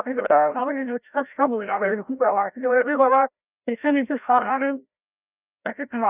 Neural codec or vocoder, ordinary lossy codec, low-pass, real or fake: codec, 16 kHz, 0.5 kbps, FreqCodec, larger model; none; 3.6 kHz; fake